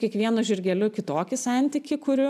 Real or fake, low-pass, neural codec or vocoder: real; 14.4 kHz; none